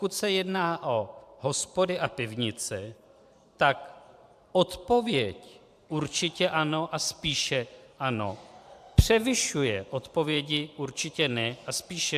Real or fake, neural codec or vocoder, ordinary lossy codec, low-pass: fake; vocoder, 44.1 kHz, 128 mel bands every 512 samples, BigVGAN v2; AAC, 96 kbps; 14.4 kHz